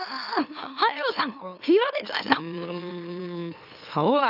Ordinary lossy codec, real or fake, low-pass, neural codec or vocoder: none; fake; 5.4 kHz; autoencoder, 44.1 kHz, a latent of 192 numbers a frame, MeloTTS